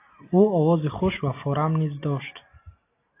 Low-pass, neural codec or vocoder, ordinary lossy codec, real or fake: 3.6 kHz; none; AAC, 24 kbps; real